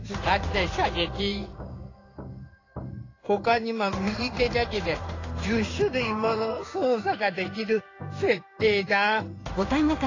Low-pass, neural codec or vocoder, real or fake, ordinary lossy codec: 7.2 kHz; codec, 16 kHz in and 24 kHz out, 1 kbps, XY-Tokenizer; fake; AAC, 32 kbps